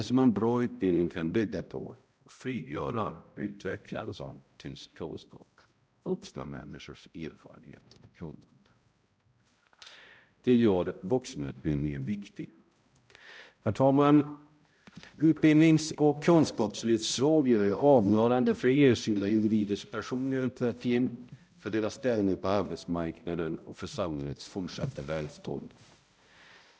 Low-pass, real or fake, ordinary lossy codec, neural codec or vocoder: none; fake; none; codec, 16 kHz, 0.5 kbps, X-Codec, HuBERT features, trained on balanced general audio